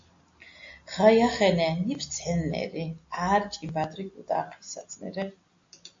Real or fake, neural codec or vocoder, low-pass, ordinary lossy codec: real; none; 7.2 kHz; AAC, 48 kbps